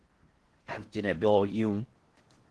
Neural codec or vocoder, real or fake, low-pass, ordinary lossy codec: codec, 16 kHz in and 24 kHz out, 0.6 kbps, FocalCodec, streaming, 4096 codes; fake; 10.8 kHz; Opus, 16 kbps